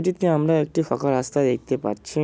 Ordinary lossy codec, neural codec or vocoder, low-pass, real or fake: none; none; none; real